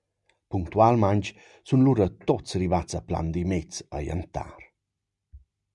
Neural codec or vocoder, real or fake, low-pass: none; real; 10.8 kHz